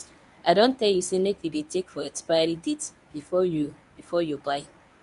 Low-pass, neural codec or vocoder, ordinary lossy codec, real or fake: 10.8 kHz; codec, 24 kHz, 0.9 kbps, WavTokenizer, medium speech release version 1; none; fake